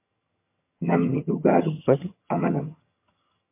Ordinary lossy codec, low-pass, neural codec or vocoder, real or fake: MP3, 24 kbps; 3.6 kHz; vocoder, 22.05 kHz, 80 mel bands, HiFi-GAN; fake